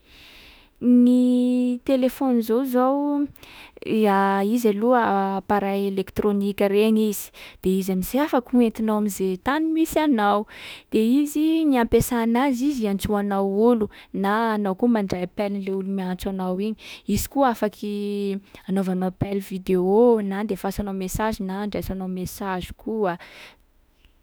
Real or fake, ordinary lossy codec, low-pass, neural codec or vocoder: fake; none; none; autoencoder, 48 kHz, 32 numbers a frame, DAC-VAE, trained on Japanese speech